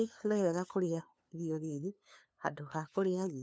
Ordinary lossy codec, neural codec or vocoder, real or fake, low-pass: none; codec, 16 kHz, 2 kbps, FunCodec, trained on LibriTTS, 25 frames a second; fake; none